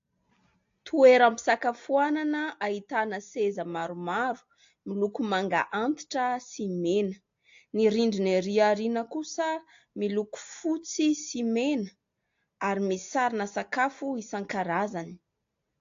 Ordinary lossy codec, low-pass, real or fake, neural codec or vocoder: AAC, 48 kbps; 7.2 kHz; real; none